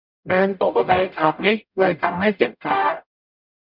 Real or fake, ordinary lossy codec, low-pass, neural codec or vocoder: fake; none; 5.4 kHz; codec, 44.1 kHz, 0.9 kbps, DAC